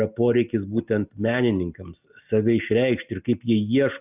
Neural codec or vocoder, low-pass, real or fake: none; 3.6 kHz; real